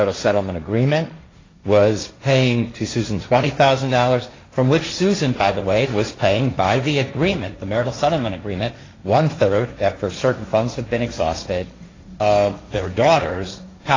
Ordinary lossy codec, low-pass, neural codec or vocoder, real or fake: AAC, 32 kbps; 7.2 kHz; codec, 16 kHz, 1.1 kbps, Voila-Tokenizer; fake